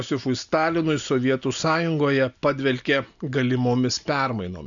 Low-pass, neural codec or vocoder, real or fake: 7.2 kHz; none; real